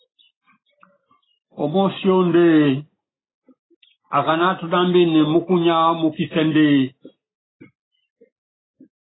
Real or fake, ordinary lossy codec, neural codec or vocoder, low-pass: real; AAC, 16 kbps; none; 7.2 kHz